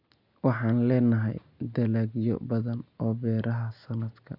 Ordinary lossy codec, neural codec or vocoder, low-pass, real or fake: none; none; 5.4 kHz; real